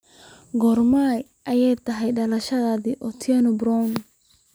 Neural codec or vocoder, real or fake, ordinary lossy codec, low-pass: none; real; none; none